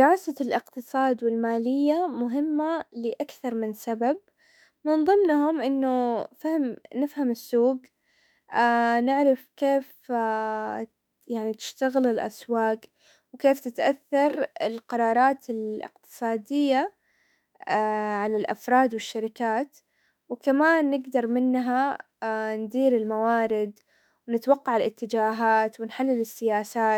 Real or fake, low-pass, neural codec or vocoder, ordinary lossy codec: fake; 19.8 kHz; autoencoder, 48 kHz, 32 numbers a frame, DAC-VAE, trained on Japanese speech; none